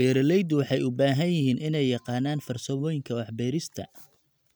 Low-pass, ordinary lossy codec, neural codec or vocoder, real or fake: none; none; none; real